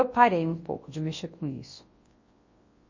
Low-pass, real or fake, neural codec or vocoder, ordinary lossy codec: 7.2 kHz; fake; codec, 24 kHz, 0.9 kbps, WavTokenizer, large speech release; MP3, 32 kbps